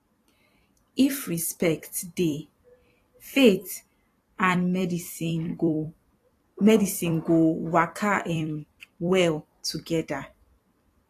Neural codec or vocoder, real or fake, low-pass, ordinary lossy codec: vocoder, 44.1 kHz, 128 mel bands every 256 samples, BigVGAN v2; fake; 14.4 kHz; AAC, 48 kbps